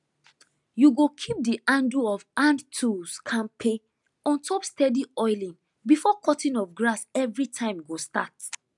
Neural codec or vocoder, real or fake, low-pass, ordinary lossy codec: none; real; 10.8 kHz; none